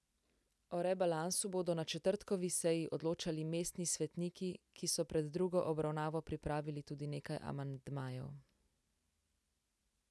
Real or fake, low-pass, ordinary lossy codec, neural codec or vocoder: real; none; none; none